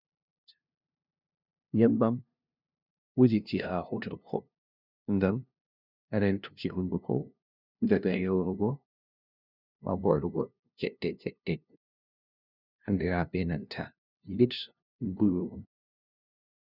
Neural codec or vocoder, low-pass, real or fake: codec, 16 kHz, 0.5 kbps, FunCodec, trained on LibriTTS, 25 frames a second; 5.4 kHz; fake